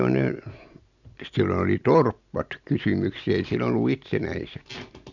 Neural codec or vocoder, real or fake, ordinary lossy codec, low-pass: none; real; none; 7.2 kHz